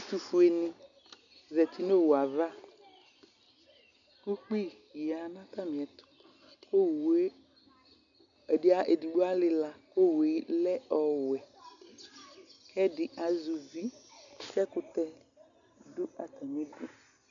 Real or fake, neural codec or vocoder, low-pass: real; none; 7.2 kHz